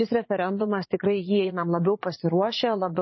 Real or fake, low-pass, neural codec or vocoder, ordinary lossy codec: fake; 7.2 kHz; vocoder, 44.1 kHz, 80 mel bands, Vocos; MP3, 24 kbps